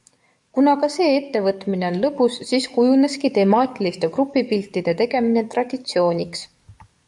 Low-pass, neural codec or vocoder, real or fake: 10.8 kHz; codec, 44.1 kHz, 7.8 kbps, DAC; fake